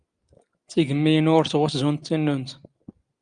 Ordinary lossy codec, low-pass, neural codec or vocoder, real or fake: Opus, 32 kbps; 9.9 kHz; none; real